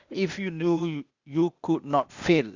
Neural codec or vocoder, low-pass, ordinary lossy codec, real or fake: codec, 16 kHz, 0.8 kbps, ZipCodec; 7.2 kHz; Opus, 64 kbps; fake